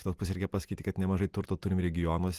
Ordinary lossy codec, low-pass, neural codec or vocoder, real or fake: Opus, 24 kbps; 14.4 kHz; none; real